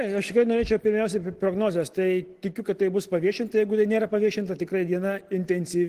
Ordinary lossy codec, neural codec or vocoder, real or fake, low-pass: Opus, 16 kbps; none; real; 14.4 kHz